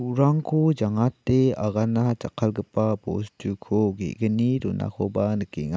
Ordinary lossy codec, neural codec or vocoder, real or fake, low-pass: none; none; real; none